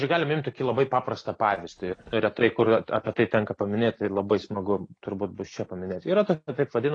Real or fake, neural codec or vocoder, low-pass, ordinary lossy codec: real; none; 9.9 kHz; AAC, 32 kbps